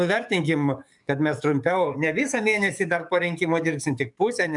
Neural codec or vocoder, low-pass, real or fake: codec, 44.1 kHz, 7.8 kbps, DAC; 10.8 kHz; fake